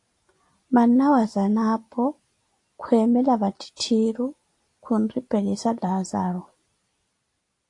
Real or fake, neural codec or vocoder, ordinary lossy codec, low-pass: real; none; AAC, 48 kbps; 10.8 kHz